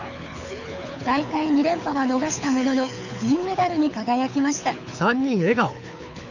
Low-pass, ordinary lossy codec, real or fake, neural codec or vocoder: 7.2 kHz; none; fake; codec, 24 kHz, 6 kbps, HILCodec